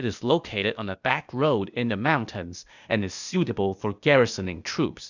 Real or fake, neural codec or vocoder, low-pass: fake; codec, 16 kHz, 0.8 kbps, ZipCodec; 7.2 kHz